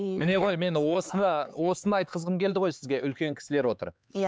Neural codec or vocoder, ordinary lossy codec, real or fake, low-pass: codec, 16 kHz, 4 kbps, X-Codec, HuBERT features, trained on LibriSpeech; none; fake; none